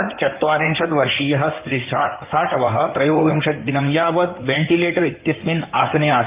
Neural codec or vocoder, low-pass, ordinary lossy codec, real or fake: codec, 16 kHz in and 24 kHz out, 2.2 kbps, FireRedTTS-2 codec; 3.6 kHz; Opus, 24 kbps; fake